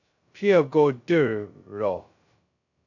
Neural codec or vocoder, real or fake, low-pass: codec, 16 kHz, 0.2 kbps, FocalCodec; fake; 7.2 kHz